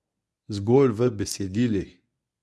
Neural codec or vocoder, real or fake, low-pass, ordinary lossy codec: codec, 24 kHz, 0.9 kbps, WavTokenizer, medium speech release version 1; fake; none; none